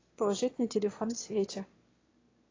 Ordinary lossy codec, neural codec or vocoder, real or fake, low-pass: AAC, 32 kbps; autoencoder, 22.05 kHz, a latent of 192 numbers a frame, VITS, trained on one speaker; fake; 7.2 kHz